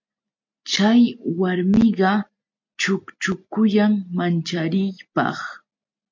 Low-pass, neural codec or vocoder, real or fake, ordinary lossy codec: 7.2 kHz; none; real; MP3, 48 kbps